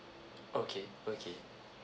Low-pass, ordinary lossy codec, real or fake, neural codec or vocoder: none; none; real; none